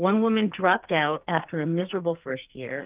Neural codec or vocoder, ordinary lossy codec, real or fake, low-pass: codec, 44.1 kHz, 2.6 kbps, SNAC; Opus, 24 kbps; fake; 3.6 kHz